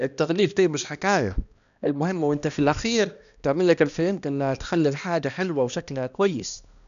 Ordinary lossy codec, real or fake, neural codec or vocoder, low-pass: none; fake; codec, 16 kHz, 1 kbps, X-Codec, HuBERT features, trained on balanced general audio; 7.2 kHz